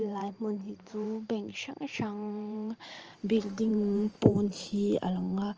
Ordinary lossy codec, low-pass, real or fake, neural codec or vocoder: Opus, 24 kbps; 7.2 kHz; fake; vocoder, 44.1 kHz, 128 mel bands every 512 samples, BigVGAN v2